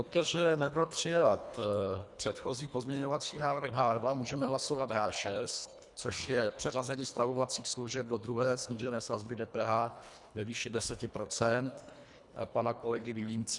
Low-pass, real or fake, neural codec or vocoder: 10.8 kHz; fake; codec, 24 kHz, 1.5 kbps, HILCodec